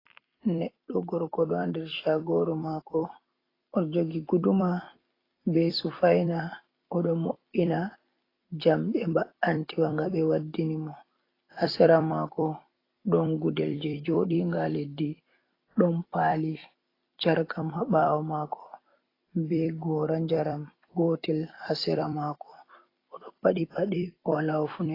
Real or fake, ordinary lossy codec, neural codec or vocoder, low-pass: fake; AAC, 24 kbps; vocoder, 44.1 kHz, 128 mel bands every 256 samples, BigVGAN v2; 5.4 kHz